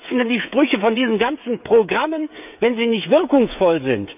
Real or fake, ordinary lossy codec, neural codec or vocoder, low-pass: fake; none; codec, 16 kHz, 16 kbps, FreqCodec, smaller model; 3.6 kHz